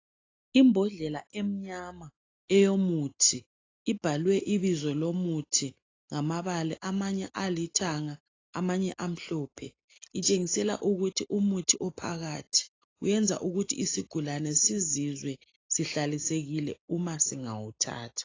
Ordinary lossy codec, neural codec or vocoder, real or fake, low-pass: AAC, 32 kbps; none; real; 7.2 kHz